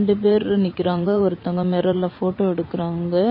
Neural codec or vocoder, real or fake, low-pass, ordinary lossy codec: vocoder, 44.1 kHz, 80 mel bands, Vocos; fake; 5.4 kHz; MP3, 24 kbps